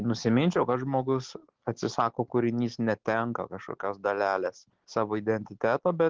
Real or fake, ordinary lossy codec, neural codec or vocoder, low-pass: real; Opus, 16 kbps; none; 7.2 kHz